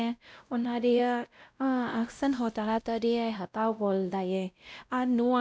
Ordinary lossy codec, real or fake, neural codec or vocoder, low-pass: none; fake; codec, 16 kHz, 0.5 kbps, X-Codec, WavLM features, trained on Multilingual LibriSpeech; none